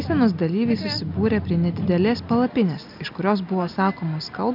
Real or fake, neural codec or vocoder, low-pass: real; none; 5.4 kHz